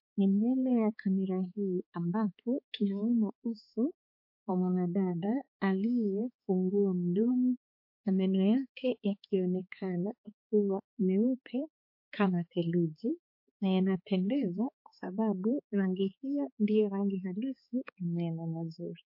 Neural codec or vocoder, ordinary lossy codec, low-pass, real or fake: codec, 16 kHz, 4 kbps, X-Codec, HuBERT features, trained on balanced general audio; MP3, 32 kbps; 5.4 kHz; fake